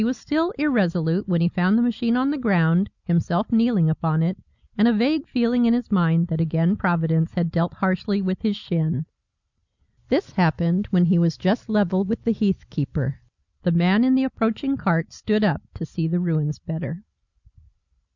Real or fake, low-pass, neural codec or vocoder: real; 7.2 kHz; none